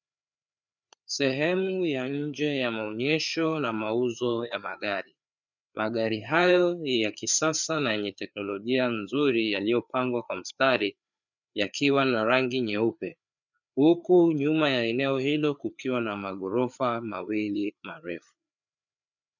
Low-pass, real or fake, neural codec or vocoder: 7.2 kHz; fake; codec, 16 kHz, 4 kbps, FreqCodec, larger model